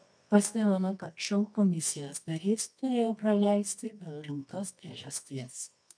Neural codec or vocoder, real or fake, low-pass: codec, 24 kHz, 0.9 kbps, WavTokenizer, medium music audio release; fake; 9.9 kHz